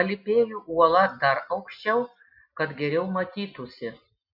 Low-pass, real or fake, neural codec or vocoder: 5.4 kHz; real; none